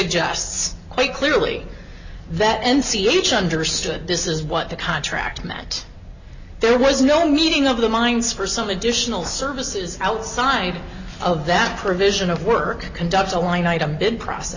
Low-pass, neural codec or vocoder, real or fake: 7.2 kHz; none; real